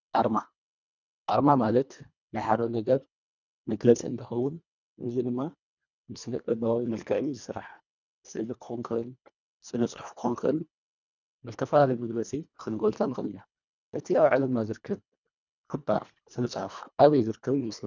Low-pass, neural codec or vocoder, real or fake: 7.2 kHz; codec, 24 kHz, 1.5 kbps, HILCodec; fake